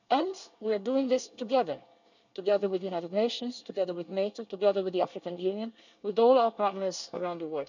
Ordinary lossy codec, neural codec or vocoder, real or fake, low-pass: none; codec, 24 kHz, 1 kbps, SNAC; fake; 7.2 kHz